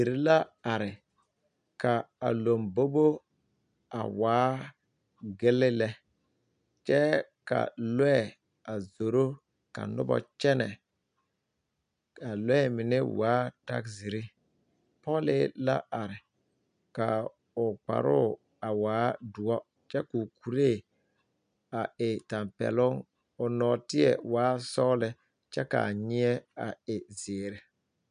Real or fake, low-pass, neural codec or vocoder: real; 9.9 kHz; none